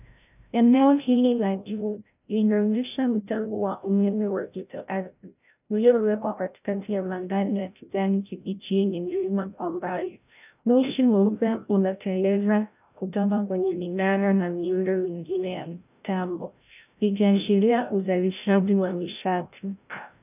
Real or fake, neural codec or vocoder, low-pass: fake; codec, 16 kHz, 0.5 kbps, FreqCodec, larger model; 3.6 kHz